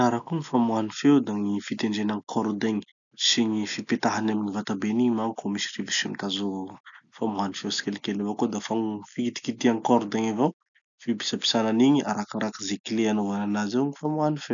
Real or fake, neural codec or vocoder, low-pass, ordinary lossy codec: real; none; 7.2 kHz; none